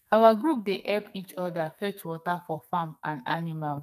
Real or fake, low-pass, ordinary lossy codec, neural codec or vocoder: fake; 14.4 kHz; none; codec, 44.1 kHz, 2.6 kbps, SNAC